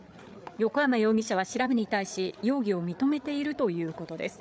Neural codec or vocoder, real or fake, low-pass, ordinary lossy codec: codec, 16 kHz, 8 kbps, FreqCodec, larger model; fake; none; none